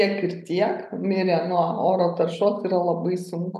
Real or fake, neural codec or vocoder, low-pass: real; none; 14.4 kHz